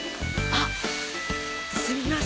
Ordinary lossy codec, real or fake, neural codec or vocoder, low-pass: none; real; none; none